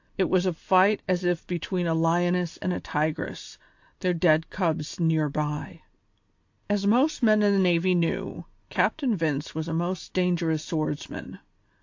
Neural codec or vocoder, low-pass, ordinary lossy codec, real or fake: none; 7.2 kHz; AAC, 48 kbps; real